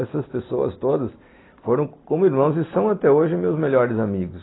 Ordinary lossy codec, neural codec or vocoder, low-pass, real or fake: AAC, 16 kbps; none; 7.2 kHz; real